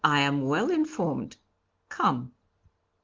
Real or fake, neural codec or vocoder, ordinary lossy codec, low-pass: real; none; Opus, 16 kbps; 7.2 kHz